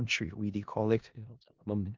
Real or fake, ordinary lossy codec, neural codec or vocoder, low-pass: fake; Opus, 24 kbps; codec, 16 kHz in and 24 kHz out, 0.4 kbps, LongCat-Audio-Codec, four codebook decoder; 7.2 kHz